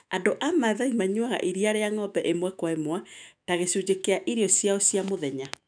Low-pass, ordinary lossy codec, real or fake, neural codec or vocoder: 9.9 kHz; none; fake; autoencoder, 48 kHz, 128 numbers a frame, DAC-VAE, trained on Japanese speech